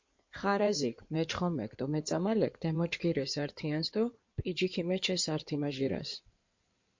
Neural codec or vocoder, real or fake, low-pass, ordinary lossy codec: codec, 16 kHz in and 24 kHz out, 2.2 kbps, FireRedTTS-2 codec; fake; 7.2 kHz; MP3, 48 kbps